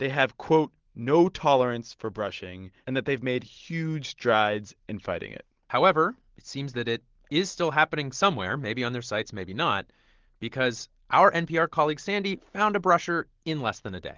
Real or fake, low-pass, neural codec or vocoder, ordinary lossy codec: real; 7.2 kHz; none; Opus, 16 kbps